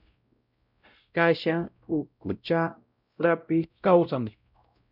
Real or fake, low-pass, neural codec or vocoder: fake; 5.4 kHz; codec, 16 kHz, 0.5 kbps, X-Codec, WavLM features, trained on Multilingual LibriSpeech